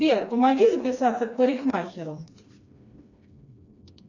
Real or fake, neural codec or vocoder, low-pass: fake; codec, 16 kHz, 2 kbps, FreqCodec, smaller model; 7.2 kHz